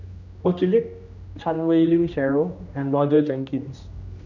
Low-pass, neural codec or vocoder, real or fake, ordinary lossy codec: 7.2 kHz; codec, 16 kHz, 1 kbps, X-Codec, HuBERT features, trained on general audio; fake; none